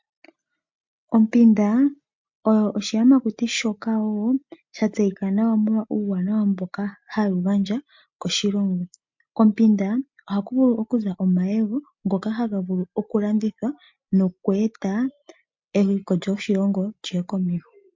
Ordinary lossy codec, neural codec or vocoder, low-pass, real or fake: MP3, 48 kbps; none; 7.2 kHz; real